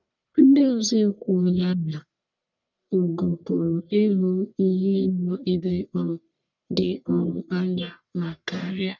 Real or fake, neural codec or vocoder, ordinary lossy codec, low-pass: fake; codec, 44.1 kHz, 1.7 kbps, Pupu-Codec; none; 7.2 kHz